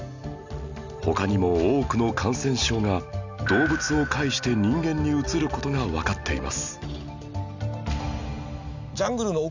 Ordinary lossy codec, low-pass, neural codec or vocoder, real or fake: none; 7.2 kHz; none; real